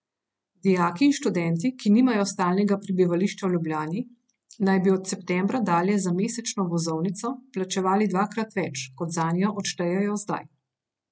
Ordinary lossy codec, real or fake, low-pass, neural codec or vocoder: none; real; none; none